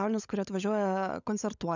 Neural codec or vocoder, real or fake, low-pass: codec, 16 kHz, 16 kbps, FunCodec, trained on LibriTTS, 50 frames a second; fake; 7.2 kHz